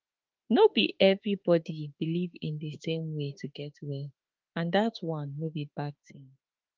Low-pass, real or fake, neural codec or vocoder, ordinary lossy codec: 7.2 kHz; fake; autoencoder, 48 kHz, 32 numbers a frame, DAC-VAE, trained on Japanese speech; Opus, 24 kbps